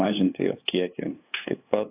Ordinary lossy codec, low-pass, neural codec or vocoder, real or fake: AAC, 32 kbps; 3.6 kHz; codec, 16 kHz, 2 kbps, FunCodec, trained on LibriTTS, 25 frames a second; fake